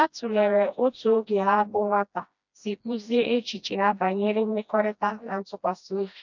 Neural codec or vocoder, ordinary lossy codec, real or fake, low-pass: codec, 16 kHz, 1 kbps, FreqCodec, smaller model; none; fake; 7.2 kHz